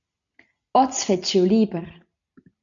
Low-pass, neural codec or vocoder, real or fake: 7.2 kHz; none; real